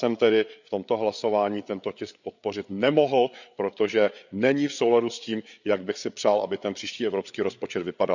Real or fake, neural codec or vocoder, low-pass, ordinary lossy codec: fake; codec, 16 kHz, 8 kbps, FreqCodec, larger model; 7.2 kHz; none